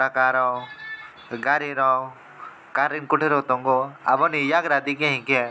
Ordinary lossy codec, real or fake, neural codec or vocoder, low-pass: none; real; none; none